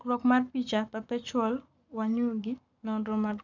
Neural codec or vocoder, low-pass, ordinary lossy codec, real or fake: codec, 44.1 kHz, 7.8 kbps, Pupu-Codec; 7.2 kHz; none; fake